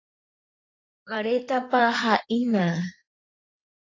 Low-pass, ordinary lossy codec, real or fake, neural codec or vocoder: 7.2 kHz; AAC, 32 kbps; fake; codec, 16 kHz in and 24 kHz out, 2.2 kbps, FireRedTTS-2 codec